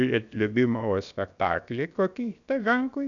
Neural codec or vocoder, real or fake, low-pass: codec, 16 kHz, about 1 kbps, DyCAST, with the encoder's durations; fake; 7.2 kHz